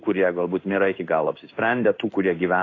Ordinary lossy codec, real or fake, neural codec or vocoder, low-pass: AAC, 32 kbps; fake; codec, 16 kHz in and 24 kHz out, 1 kbps, XY-Tokenizer; 7.2 kHz